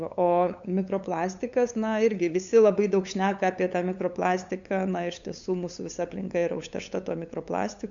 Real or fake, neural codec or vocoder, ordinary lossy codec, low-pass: fake; codec, 16 kHz, 8 kbps, FunCodec, trained on LibriTTS, 25 frames a second; MP3, 64 kbps; 7.2 kHz